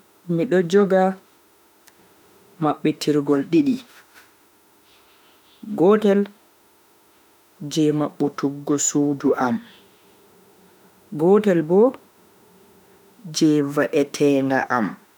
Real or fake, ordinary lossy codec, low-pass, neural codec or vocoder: fake; none; none; autoencoder, 48 kHz, 32 numbers a frame, DAC-VAE, trained on Japanese speech